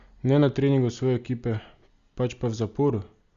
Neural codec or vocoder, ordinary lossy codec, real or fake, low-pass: none; Opus, 64 kbps; real; 7.2 kHz